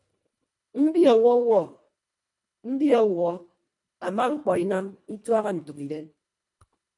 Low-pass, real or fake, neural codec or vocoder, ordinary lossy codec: 10.8 kHz; fake; codec, 24 kHz, 1.5 kbps, HILCodec; MP3, 64 kbps